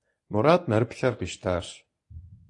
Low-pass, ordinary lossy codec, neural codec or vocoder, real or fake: 10.8 kHz; AAC, 48 kbps; codec, 24 kHz, 0.9 kbps, WavTokenizer, medium speech release version 1; fake